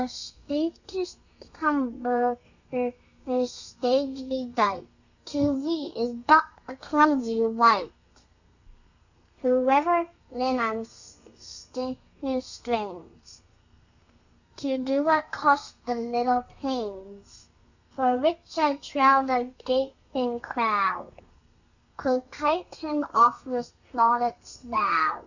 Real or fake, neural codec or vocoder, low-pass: fake; codec, 32 kHz, 1.9 kbps, SNAC; 7.2 kHz